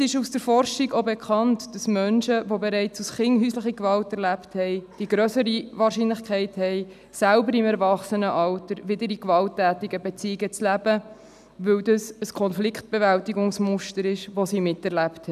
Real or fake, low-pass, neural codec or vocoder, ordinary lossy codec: real; 14.4 kHz; none; none